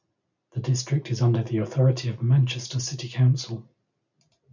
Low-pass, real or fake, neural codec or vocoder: 7.2 kHz; real; none